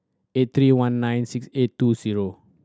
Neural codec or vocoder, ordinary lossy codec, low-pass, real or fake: none; none; none; real